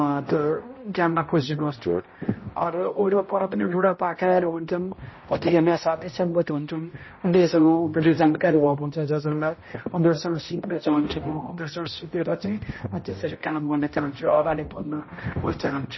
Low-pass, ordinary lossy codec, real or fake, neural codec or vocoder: 7.2 kHz; MP3, 24 kbps; fake; codec, 16 kHz, 0.5 kbps, X-Codec, HuBERT features, trained on balanced general audio